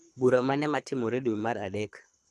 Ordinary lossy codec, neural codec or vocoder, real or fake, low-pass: none; codec, 24 kHz, 3 kbps, HILCodec; fake; none